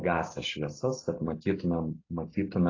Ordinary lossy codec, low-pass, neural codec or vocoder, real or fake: AAC, 32 kbps; 7.2 kHz; none; real